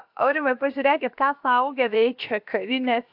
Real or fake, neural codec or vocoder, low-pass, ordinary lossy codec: fake; codec, 16 kHz, about 1 kbps, DyCAST, with the encoder's durations; 5.4 kHz; AAC, 48 kbps